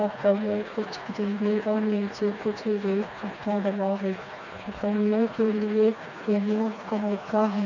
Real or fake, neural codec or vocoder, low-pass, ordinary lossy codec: fake; codec, 16 kHz, 2 kbps, FreqCodec, smaller model; 7.2 kHz; none